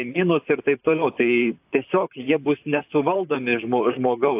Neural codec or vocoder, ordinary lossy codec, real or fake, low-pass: vocoder, 44.1 kHz, 128 mel bands, Pupu-Vocoder; AAC, 32 kbps; fake; 3.6 kHz